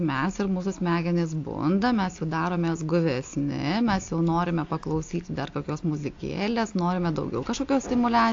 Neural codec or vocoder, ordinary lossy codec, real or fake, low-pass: none; AAC, 48 kbps; real; 7.2 kHz